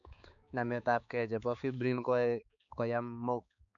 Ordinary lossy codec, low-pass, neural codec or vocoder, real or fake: none; 7.2 kHz; codec, 16 kHz, 4 kbps, X-Codec, HuBERT features, trained on balanced general audio; fake